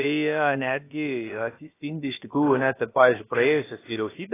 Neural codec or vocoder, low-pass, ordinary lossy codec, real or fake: codec, 16 kHz, about 1 kbps, DyCAST, with the encoder's durations; 3.6 kHz; AAC, 16 kbps; fake